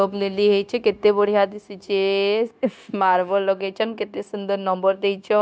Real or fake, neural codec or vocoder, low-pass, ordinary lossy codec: fake; codec, 16 kHz, 0.9 kbps, LongCat-Audio-Codec; none; none